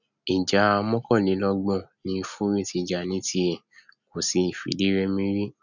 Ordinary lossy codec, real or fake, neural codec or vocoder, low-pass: none; real; none; 7.2 kHz